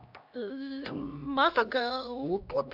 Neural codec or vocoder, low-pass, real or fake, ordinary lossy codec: codec, 16 kHz, 1 kbps, X-Codec, HuBERT features, trained on LibriSpeech; 5.4 kHz; fake; AAC, 48 kbps